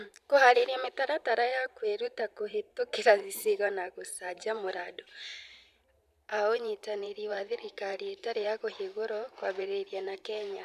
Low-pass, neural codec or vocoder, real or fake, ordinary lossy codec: 14.4 kHz; vocoder, 44.1 kHz, 128 mel bands every 512 samples, BigVGAN v2; fake; none